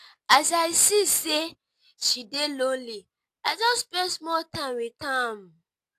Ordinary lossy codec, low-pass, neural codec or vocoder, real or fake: AAC, 64 kbps; 14.4 kHz; none; real